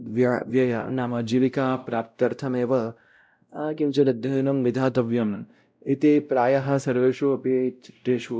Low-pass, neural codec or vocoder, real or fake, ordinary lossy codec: none; codec, 16 kHz, 0.5 kbps, X-Codec, WavLM features, trained on Multilingual LibriSpeech; fake; none